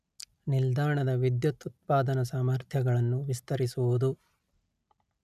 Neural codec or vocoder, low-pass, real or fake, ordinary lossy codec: none; 14.4 kHz; real; none